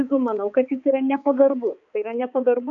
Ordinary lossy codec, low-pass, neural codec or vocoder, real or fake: AAC, 64 kbps; 7.2 kHz; codec, 16 kHz, 4 kbps, X-Codec, HuBERT features, trained on balanced general audio; fake